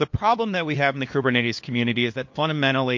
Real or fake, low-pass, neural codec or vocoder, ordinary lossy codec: fake; 7.2 kHz; codec, 16 kHz, 2 kbps, FunCodec, trained on LibriTTS, 25 frames a second; MP3, 48 kbps